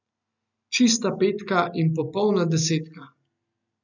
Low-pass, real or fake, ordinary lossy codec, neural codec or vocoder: 7.2 kHz; real; none; none